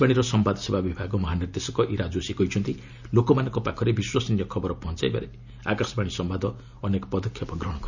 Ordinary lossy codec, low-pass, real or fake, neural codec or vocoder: none; 7.2 kHz; real; none